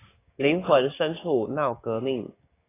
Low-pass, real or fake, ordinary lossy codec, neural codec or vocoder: 3.6 kHz; fake; AAC, 16 kbps; codec, 16 kHz, 6 kbps, DAC